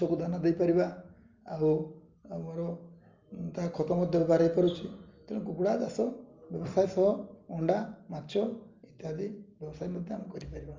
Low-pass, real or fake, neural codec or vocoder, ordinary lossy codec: 7.2 kHz; real; none; Opus, 32 kbps